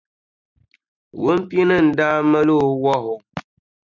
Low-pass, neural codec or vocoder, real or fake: 7.2 kHz; none; real